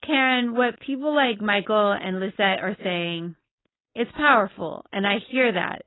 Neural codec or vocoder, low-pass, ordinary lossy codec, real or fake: codec, 16 kHz, 4.8 kbps, FACodec; 7.2 kHz; AAC, 16 kbps; fake